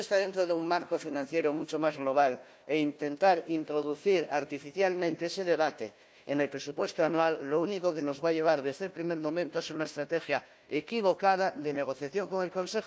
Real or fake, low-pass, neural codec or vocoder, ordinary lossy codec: fake; none; codec, 16 kHz, 1 kbps, FunCodec, trained on Chinese and English, 50 frames a second; none